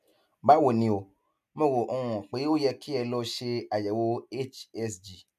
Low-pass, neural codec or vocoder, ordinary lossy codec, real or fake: 14.4 kHz; none; MP3, 96 kbps; real